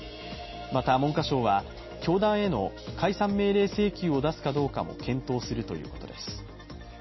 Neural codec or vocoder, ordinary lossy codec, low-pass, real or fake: none; MP3, 24 kbps; 7.2 kHz; real